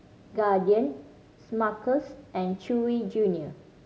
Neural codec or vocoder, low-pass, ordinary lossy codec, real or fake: none; none; none; real